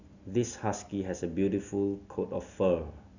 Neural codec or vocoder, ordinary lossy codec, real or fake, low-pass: none; none; real; 7.2 kHz